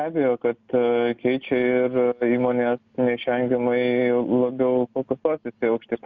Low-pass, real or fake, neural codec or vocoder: 7.2 kHz; real; none